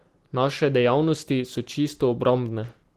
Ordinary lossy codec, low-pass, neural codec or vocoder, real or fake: Opus, 16 kbps; 14.4 kHz; none; real